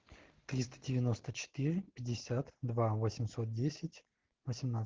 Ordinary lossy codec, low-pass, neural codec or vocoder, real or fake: Opus, 16 kbps; 7.2 kHz; none; real